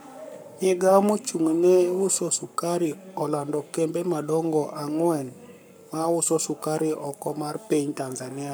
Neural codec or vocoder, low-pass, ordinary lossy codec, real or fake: codec, 44.1 kHz, 7.8 kbps, Pupu-Codec; none; none; fake